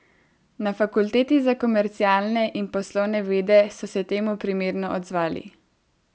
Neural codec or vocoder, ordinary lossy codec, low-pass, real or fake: none; none; none; real